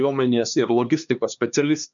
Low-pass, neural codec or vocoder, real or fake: 7.2 kHz; codec, 16 kHz, 2 kbps, X-Codec, HuBERT features, trained on LibriSpeech; fake